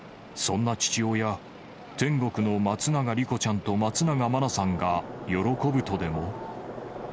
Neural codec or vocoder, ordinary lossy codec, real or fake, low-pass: none; none; real; none